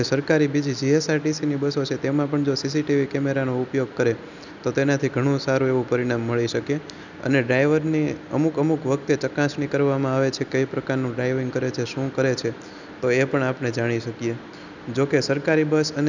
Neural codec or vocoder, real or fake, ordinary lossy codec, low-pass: none; real; none; 7.2 kHz